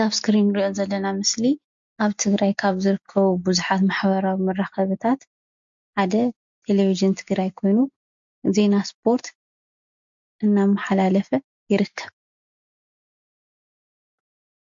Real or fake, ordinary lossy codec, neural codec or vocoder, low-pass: real; MP3, 48 kbps; none; 7.2 kHz